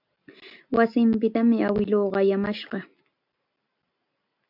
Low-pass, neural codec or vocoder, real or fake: 5.4 kHz; none; real